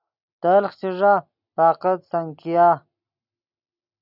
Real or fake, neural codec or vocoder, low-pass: real; none; 5.4 kHz